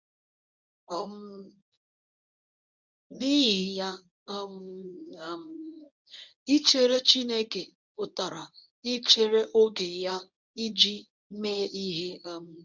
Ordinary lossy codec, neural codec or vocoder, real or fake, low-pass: none; codec, 24 kHz, 0.9 kbps, WavTokenizer, medium speech release version 1; fake; 7.2 kHz